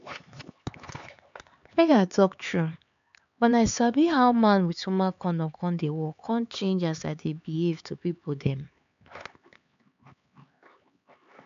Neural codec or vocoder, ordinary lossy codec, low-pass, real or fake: codec, 16 kHz, 4 kbps, X-Codec, HuBERT features, trained on LibriSpeech; MP3, 64 kbps; 7.2 kHz; fake